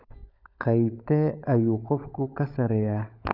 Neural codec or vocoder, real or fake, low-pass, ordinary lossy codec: codec, 16 kHz, 16 kbps, FunCodec, trained on LibriTTS, 50 frames a second; fake; 5.4 kHz; none